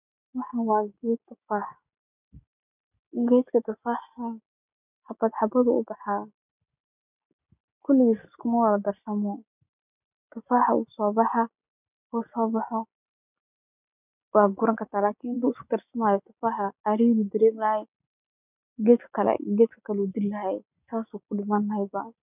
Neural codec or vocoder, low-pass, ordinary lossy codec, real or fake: none; 3.6 kHz; none; real